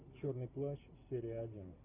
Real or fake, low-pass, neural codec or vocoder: real; 3.6 kHz; none